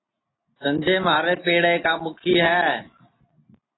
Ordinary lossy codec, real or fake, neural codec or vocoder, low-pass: AAC, 16 kbps; real; none; 7.2 kHz